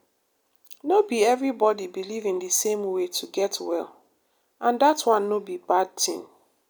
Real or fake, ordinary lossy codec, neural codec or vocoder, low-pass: real; none; none; none